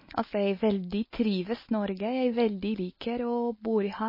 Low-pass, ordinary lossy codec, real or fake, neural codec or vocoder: 5.4 kHz; MP3, 24 kbps; real; none